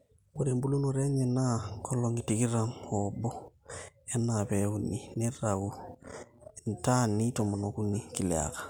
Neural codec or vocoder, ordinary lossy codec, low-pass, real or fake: none; none; 19.8 kHz; real